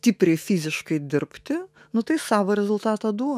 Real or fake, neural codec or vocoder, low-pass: fake; autoencoder, 48 kHz, 128 numbers a frame, DAC-VAE, trained on Japanese speech; 14.4 kHz